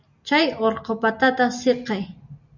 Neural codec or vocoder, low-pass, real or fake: none; 7.2 kHz; real